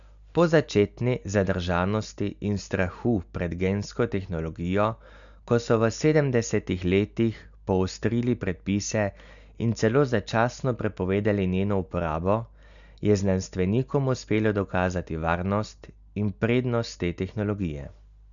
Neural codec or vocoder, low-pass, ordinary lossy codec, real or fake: none; 7.2 kHz; none; real